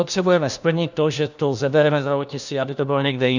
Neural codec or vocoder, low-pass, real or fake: codec, 16 kHz, 1 kbps, FunCodec, trained on LibriTTS, 50 frames a second; 7.2 kHz; fake